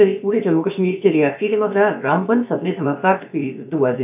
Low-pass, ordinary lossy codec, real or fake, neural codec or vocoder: 3.6 kHz; AAC, 32 kbps; fake; codec, 16 kHz, about 1 kbps, DyCAST, with the encoder's durations